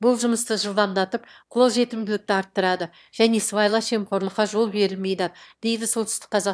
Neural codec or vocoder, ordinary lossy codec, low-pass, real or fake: autoencoder, 22.05 kHz, a latent of 192 numbers a frame, VITS, trained on one speaker; none; none; fake